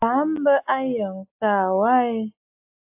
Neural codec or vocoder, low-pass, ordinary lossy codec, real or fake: none; 3.6 kHz; AAC, 32 kbps; real